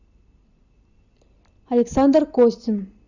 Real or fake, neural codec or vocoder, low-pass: real; none; 7.2 kHz